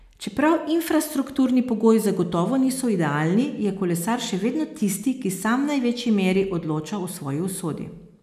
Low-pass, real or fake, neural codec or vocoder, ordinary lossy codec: 14.4 kHz; real; none; none